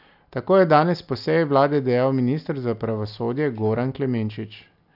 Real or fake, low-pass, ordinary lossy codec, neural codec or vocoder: real; 5.4 kHz; none; none